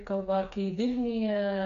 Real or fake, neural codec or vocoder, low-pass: fake; codec, 16 kHz, 4 kbps, FreqCodec, smaller model; 7.2 kHz